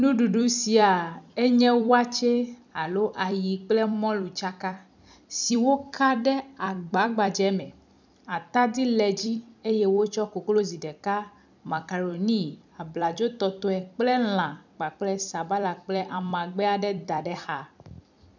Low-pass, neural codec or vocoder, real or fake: 7.2 kHz; vocoder, 44.1 kHz, 128 mel bands every 256 samples, BigVGAN v2; fake